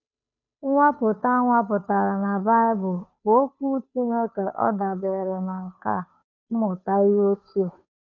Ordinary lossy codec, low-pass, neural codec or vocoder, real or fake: none; 7.2 kHz; codec, 16 kHz, 2 kbps, FunCodec, trained on Chinese and English, 25 frames a second; fake